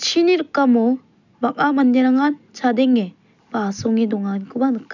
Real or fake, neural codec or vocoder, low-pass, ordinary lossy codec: real; none; 7.2 kHz; none